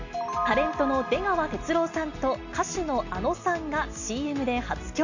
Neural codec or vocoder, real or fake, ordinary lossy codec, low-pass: none; real; none; 7.2 kHz